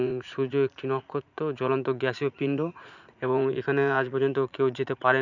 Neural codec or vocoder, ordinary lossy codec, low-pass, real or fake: vocoder, 44.1 kHz, 80 mel bands, Vocos; none; 7.2 kHz; fake